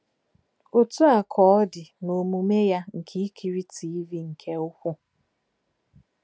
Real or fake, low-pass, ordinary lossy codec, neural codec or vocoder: real; none; none; none